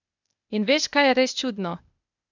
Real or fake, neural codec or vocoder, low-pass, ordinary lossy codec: fake; codec, 16 kHz, 0.8 kbps, ZipCodec; 7.2 kHz; none